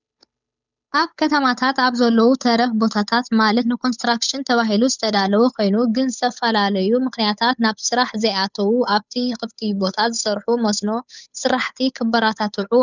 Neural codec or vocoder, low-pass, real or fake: codec, 16 kHz, 8 kbps, FunCodec, trained on Chinese and English, 25 frames a second; 7.2 kHz; fake